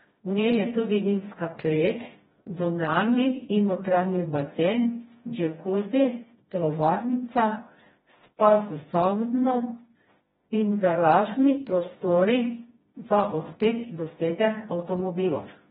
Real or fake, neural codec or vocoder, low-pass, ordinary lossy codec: fake; codec, 16 kHz, 1 kbps, FreqCodec, smaller model; 7.2 kHz; AAC, 16 kbps